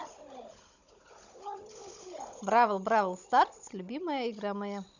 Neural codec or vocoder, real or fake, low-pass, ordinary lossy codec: codec, 16 kHz, 16 kbps, FunCodec, trained on Chinese and English, 50 frames a second; fake; 7.2 kHz; none